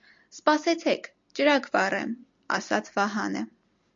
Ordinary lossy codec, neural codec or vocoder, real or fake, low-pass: MP3, 48 kbps; none; real; 7.2 kHz